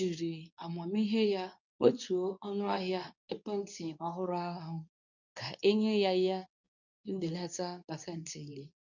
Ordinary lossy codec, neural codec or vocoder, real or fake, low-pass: none; codec, 24 kHz, 0.9 kbps, WavTokenizer, medium speech release version 2; fake; 7.2 kHz